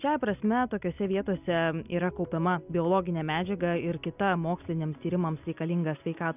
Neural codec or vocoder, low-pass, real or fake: none; 3.6 kHz; real